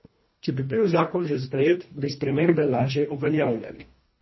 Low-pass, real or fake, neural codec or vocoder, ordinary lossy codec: 7.2 kHz; fake; codec, 24 kHz, 1.5 kbps, HILCodec; MP3, 24 kbps